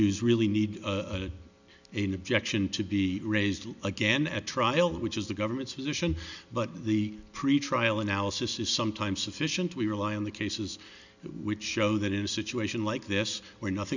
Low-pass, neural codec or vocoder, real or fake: 7.2 kHz; none; real